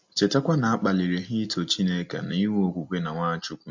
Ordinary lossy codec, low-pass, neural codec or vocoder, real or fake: MP3, 48 kbps; 7.2 kHz; none; real